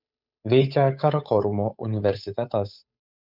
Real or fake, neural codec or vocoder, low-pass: fake; codec, 16 kHz, 8 kbps, FunCodec, trained on Chinese and English, 25 frames a second; 5.4 kHz